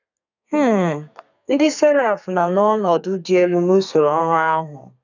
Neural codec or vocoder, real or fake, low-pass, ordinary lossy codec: codec, 32 kHz, 1.9 kbps, SNAC; fake; 7.2 kHz; none